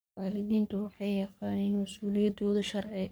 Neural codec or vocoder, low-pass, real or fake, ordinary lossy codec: codec, 44.1 kHz, 3.4 kbps, Pupu-Codec; none; fake; none